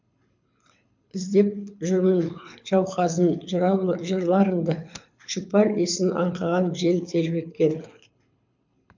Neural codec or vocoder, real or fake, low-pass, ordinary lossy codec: codec, 24 kHz, 6 kbps, HILCodec; fake; 7.2 kHz; MP3, 64 kbps